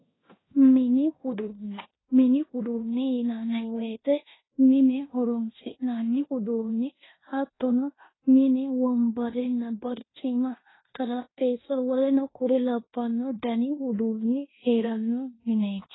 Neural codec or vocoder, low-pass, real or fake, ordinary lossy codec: codec, 16 kHz in and 24 kHz out, 0.9 kbps, LongCat-Audio-Codec, fine tuned four codebook decoder; 7.2 kHz; fake; AAC, 16 kbps